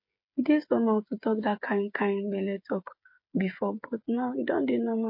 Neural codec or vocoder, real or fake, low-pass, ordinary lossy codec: codec, 16 kHz, 8 kbps, FreqCodec, smaller model; fake; 5.4 kHz; MP3, 32 kbps